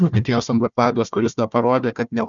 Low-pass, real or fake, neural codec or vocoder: 7.2 kHz; fake; codec, 16 kHz, 1 kbps, FunCodec, trained on Chinese and English, 50 frames a second